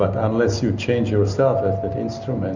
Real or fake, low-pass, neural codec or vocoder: real; 7.2 kHz; none